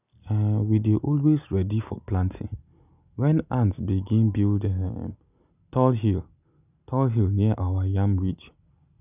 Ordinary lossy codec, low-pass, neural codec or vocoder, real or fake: none; 3.6 kHz; none; real